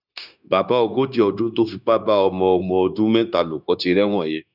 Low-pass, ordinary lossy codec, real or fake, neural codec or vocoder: 5.4 kHz; none; fake; codec, 16 kHz, 0.9 kbps, LongCat-Audio-Codec